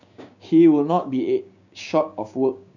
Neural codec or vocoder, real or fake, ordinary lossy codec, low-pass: codec, 16 kHz, 6 kbps, DAC; fake; none; 7.2 kHz